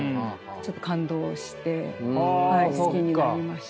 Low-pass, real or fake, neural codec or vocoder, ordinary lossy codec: none; real; none; none